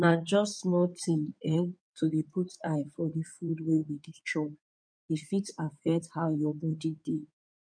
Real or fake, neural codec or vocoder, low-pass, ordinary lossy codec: fake; codec, 16 kHz in and 24 kHz out, 2.2 kbps, FireRedTTS-2 codec; 9.9 kHz; none